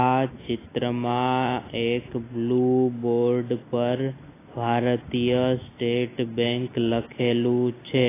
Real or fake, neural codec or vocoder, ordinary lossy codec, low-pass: real; none; AAC, 16 kbps; 3.6 kHz